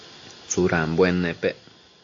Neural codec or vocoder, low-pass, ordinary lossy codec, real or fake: none; 7.2 kHz; AAC, 48 kbps; real